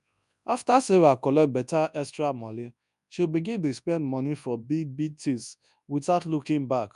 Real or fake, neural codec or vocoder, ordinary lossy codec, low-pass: fake; codec, 24 kHz, 0.9 kbps, WavTokenizer, large speech release; none; 10.8 kHz